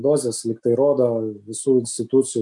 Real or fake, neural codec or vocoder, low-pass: real; none; 10.8 kHz